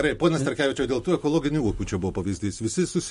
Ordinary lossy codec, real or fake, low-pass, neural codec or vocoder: MP3, 48 kbps; real; 14.4 kHz; none